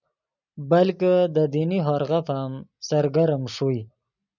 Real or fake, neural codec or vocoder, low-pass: real; none; 7.2 kHz